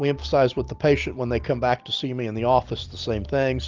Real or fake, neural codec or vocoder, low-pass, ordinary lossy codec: real; none; 7.2 kHz; Opus, 32 kbps